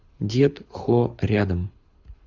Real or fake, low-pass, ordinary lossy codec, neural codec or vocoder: fake; 7.2 kHz; Opus, 64 kbps; codec, 24 kHz, 6 kbps, HILCodec